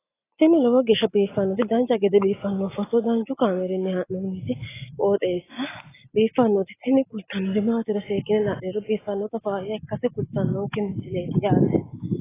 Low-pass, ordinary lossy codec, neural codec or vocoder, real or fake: 3.6 kHz; AAC, 16 kbps; none; real